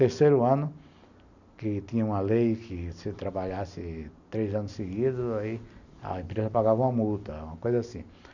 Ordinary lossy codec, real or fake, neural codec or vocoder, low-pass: none; real; none; 7.2 kHz